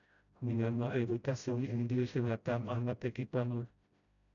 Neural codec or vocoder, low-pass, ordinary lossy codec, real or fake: codec, 16 kHz, 0.5 kbps, FreqCodec, smaller model; 7.2 kHz; MP3, 96 kbps; fake